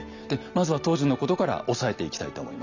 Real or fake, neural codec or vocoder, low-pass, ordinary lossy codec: real; none; 7.2 kHz; none